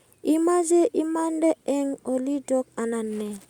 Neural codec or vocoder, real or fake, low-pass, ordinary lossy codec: none; real; 19.8 kHz; Opus, 64 kbps